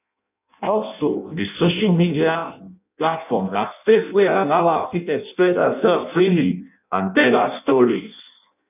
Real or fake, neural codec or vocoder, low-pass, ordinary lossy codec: fake; codec, 16 kHz in and 24 kHz out, 0.6 kbps, FireRedTTS-2 codec; 3.6 kHz; none